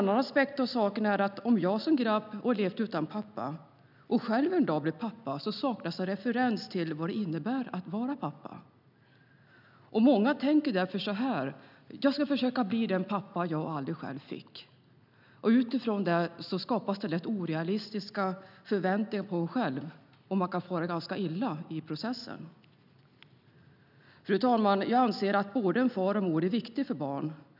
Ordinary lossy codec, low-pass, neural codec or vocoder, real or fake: none; 5.4 kHz; none; real